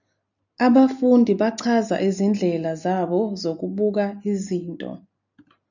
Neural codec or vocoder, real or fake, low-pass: none; real; 7.2 kHz